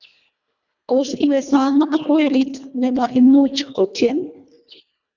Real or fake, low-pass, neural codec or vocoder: fake; 7.2 kHz; codec, 24 kHz, 1.5 kbps, HILCodec